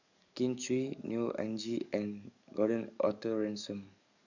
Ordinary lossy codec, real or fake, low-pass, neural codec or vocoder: none; fake; 7.2 kHz; codec, 44.1 kHz, 7.8 kbps, DAC